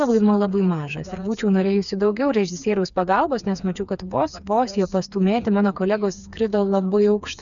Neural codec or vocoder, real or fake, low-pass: codec, 16 kHz, 4 kbps, FreqCodec, smaller model; fake; 7.2 kHz